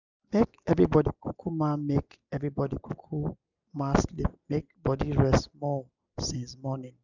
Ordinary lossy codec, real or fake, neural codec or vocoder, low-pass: none; real; none; 7.2 kHz